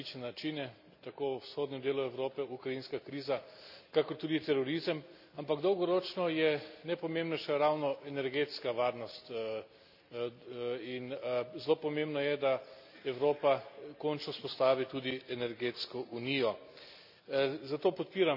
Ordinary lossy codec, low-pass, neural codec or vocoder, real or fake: none; 5.4 kHz; none; real